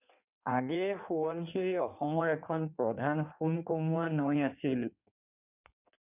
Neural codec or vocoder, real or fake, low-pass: codec, 16 kHz in and 24 kHz out, 1.1 kbps, FireRedTTS-2 codec; fake; 3.6 kHz